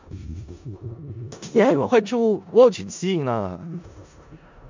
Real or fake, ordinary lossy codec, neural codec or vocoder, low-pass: fake; MP3, 64 kbps; codec, 16 kHz in and 24 kHz out, 0.4 kbps, LongCat-Audio-Codec, four codebook decoder; 7.2 kHz